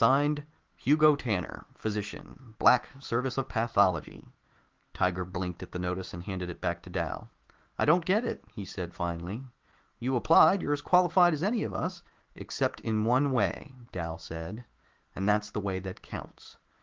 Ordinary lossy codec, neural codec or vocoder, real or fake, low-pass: Opus, 16 kbps; autoencoder, 48 kHz, 128 numbers a frame, DAC-VAE, trained on Japanese speech; fake; 7.2 kHz